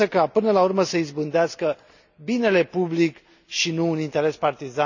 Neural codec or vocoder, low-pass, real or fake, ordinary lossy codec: none; 7.2 kHz; real; none